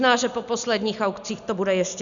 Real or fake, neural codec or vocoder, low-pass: real; none; 7.2 kHz